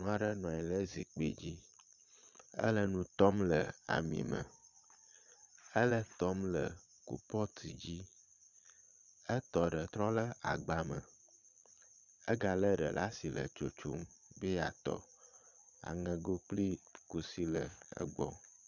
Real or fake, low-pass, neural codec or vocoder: fake; 7.2 kHz; vocoder, 22.05 kHz, 80 mel bands, Vocos